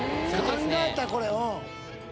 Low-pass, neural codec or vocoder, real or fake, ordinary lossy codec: none; none; real; none